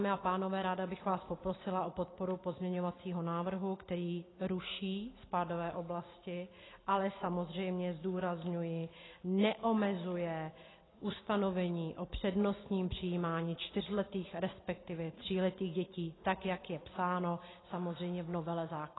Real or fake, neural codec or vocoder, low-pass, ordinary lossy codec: real; none; 7.2 kHz; AAC, 16 kbps